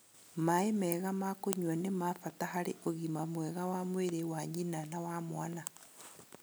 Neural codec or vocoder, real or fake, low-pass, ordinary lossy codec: none; real; none; none